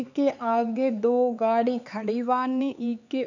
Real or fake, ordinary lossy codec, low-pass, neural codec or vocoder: fake; none; 7.2 kHz; codec, 16 kHz, 4 kbps, X-Codec, HuBERT features, trained on LibriSpeech